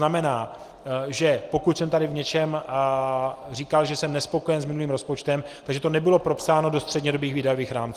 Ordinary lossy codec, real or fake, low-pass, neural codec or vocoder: Opus, 24 kbps; real; 14.4 kHz; none